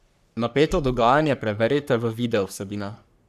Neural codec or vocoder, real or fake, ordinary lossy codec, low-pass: codec, 44.1 kHz, 3.4 kbps, Pupu-Codec; fake; none; 14.4 kHz